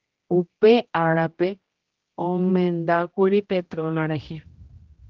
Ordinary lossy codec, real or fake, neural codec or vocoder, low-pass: Opus, 16 kbps; fake; codec, 16 kHz, 1 kbps, X-Codec, HuBERT features, trained on general audio; 7.2 kHz